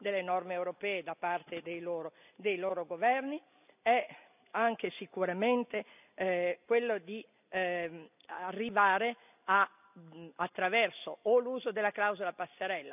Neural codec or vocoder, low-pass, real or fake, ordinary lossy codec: none; 3.6 kHz; real; none